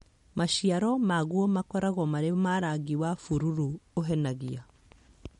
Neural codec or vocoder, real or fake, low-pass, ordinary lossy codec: none; real; 14.4 kHz; MP3, 48 kbps